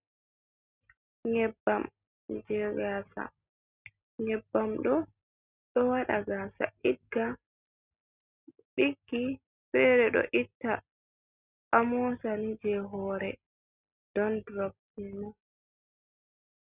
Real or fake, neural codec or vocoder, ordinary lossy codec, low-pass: real; none; Opus, 64 kbps; 3.6 kHz